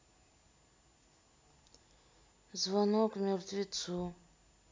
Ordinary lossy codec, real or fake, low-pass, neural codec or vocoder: none; real; none; none